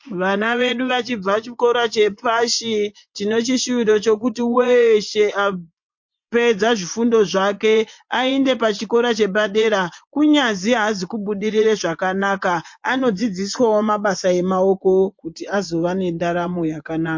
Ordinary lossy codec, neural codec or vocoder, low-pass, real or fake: MP3, 48 kbps; vocoder, 24 kHz, 100 mel bands, Vocos; 7.2 kHz; fake